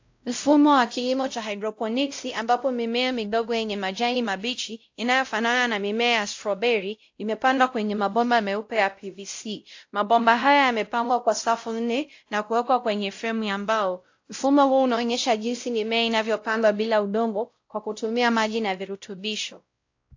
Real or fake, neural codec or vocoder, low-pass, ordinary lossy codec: fake; codec, 16 kHz, 0.5 kbps, X-Codec, WavLM features, trained on Multilingual LibriSpeech; 7.2 kHz; AAC, 48 kbps